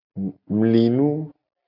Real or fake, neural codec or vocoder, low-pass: real; none; 5.4 kHz